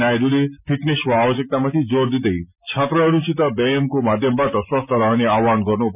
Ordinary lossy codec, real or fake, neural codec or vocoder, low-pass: none; real; none; 3.6 kHz